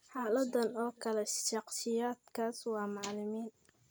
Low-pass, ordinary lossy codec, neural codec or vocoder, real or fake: none; none; none; real